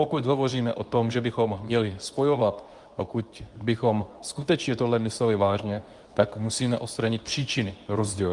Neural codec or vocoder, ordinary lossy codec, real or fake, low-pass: codec, 24 kHz, 0.9 kbps, WavTokenizer, medium speech release version 2; Opus, 32 kbps; fake; 10.8 kHz